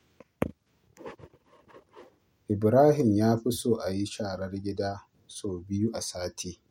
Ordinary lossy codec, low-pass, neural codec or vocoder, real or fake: MP3, 64 kbps; 19.8 kHz; none; real